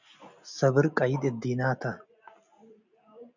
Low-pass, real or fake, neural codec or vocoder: 7.2 kHz; real; none